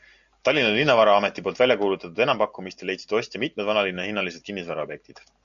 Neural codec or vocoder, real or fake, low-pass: none; real; 7.2 kHz